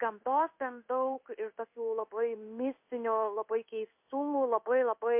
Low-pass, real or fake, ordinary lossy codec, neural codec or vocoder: 3.6 kHz; fake; MP3, 32 kbps; codec, 16 kHz in and 24 kHz out, 1 kbps, XY-Tokenizer